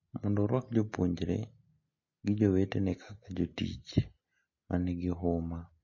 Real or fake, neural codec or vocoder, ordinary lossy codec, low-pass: real; none; MP3, 32 kbps; 7.2 kHz